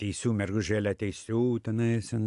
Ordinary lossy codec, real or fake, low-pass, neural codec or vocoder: AAC, 64 kbps; real; 10.8 kHz; none